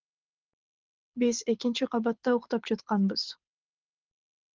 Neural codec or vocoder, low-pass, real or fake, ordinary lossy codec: codec, 24 kHz, 6 kbps, HILCodec; 7.2 kHz; fake; Opus, 32 kbps